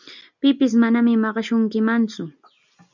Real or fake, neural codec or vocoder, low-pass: real; none; 7.2 kHz